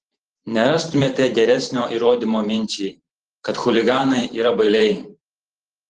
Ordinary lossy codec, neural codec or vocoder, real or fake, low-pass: Opus, 16 kbps; vocoder, 44.1 kHz, 128 mel bands every 512 samples, BigVGAN v2; fake; 10.8 kHz